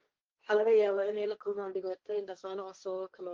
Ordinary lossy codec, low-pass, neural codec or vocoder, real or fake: Opus, 32 kbps; 7.2 kHz; codec, 16 kHz, 1.1 kbps, Voila-Tokenizer; fake